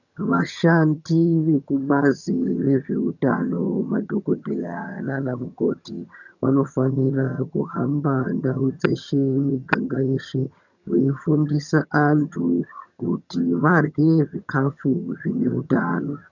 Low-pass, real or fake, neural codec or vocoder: 7.2 kHz; fake; vocoder, 22.05 kHz, 80 mel bands, HiFi-GAN